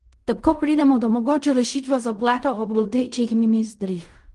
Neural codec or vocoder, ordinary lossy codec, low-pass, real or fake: codec, 16 kHz in and 24 kHz out, 0.4 kbps, LongCat-Audio-Codec, fine tuned four codebook decoder; Opus, 24 kbps; 10.8 kHz; fake